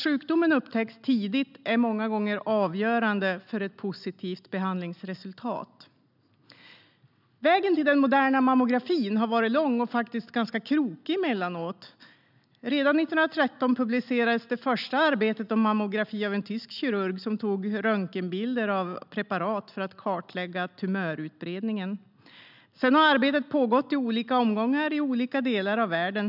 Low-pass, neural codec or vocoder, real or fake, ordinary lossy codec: 5.4 kHz; none; real; none